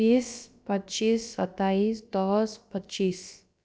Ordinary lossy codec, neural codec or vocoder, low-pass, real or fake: none; codec, 16 kHz, about 1 kbps, DyCAST, with the encoder's durations; none; fake